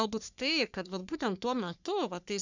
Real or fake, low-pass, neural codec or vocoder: fake; 7.2 kHz; codec, 44.1 kHz, 3.4 kbps, Pupu-Codec